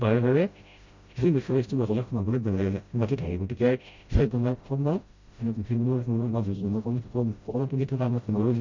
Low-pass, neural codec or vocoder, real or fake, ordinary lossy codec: 7.2 kHz; codec, 16 kHz, 0.5 kbps, FreqCodec, smaller model; fake; MP3, 48 kbps